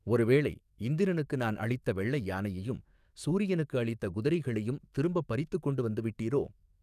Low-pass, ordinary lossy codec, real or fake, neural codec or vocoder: 14.4 kHz; none; fake; codec, 44.1 kHz, 7.8 kbps, DAC